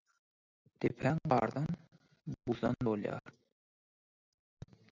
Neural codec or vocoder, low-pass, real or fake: none; 7.2 kHz; real